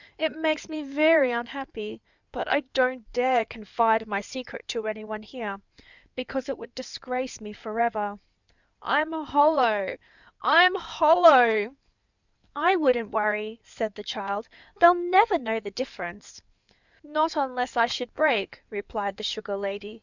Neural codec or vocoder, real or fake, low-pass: codec, 16 kHz in and 24 kHz out, 2.2 kbps, FireRedTTS-2 codec; fake; 7.2 kHz